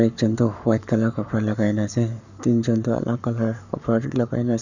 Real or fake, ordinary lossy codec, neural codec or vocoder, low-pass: fake; none; codec, 44.1 kHz, 7.8 kbps, Pupu-Codec; 7.2 kHz